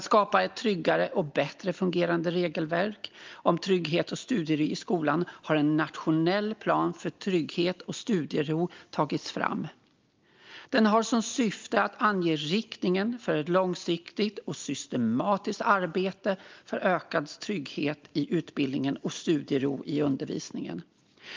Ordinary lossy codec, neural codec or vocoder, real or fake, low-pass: Opus, 24 kbps; none; real; 7.2 kHz